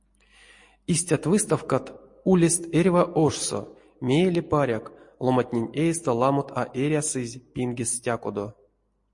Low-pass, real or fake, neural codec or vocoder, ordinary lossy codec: 10.8 kHz; real; none; MP3, 48 kbps